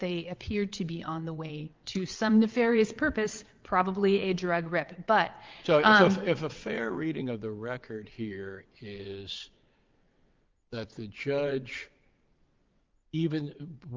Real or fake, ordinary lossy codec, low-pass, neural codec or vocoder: fake; Opus, 32 kbps; 7.2 kHz; vocoder, 22.05 kHz, 80 mel bands, WaveNeXt